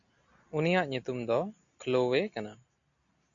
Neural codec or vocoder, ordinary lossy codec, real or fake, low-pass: none; MP3, 64 kbps; real; 7.2 kHz